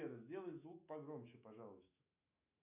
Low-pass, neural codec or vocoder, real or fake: 3.6 kHz; autoencoder, 48 kHz, 128 numbers a frame, DAC-VAE, trained on Japanese speech; fake